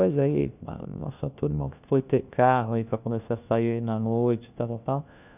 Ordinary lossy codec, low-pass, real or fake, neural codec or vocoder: none; 3.6 kHz; fake; codec, 16 kHz, 1 kbps, FunCodec, trained on LibriTTS, 50 frames a second